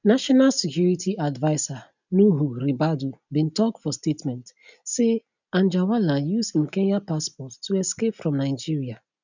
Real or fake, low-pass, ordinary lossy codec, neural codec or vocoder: real; 7.2 kHz; none; none